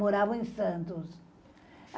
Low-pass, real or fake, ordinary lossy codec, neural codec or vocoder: none; real; none; none